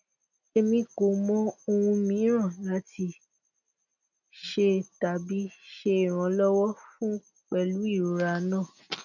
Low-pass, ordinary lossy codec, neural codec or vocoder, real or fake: 7.2 kHz; none; none; real